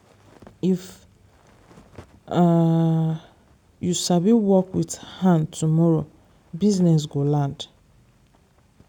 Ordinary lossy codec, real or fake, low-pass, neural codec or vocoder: none; real; 19.8 kHz; none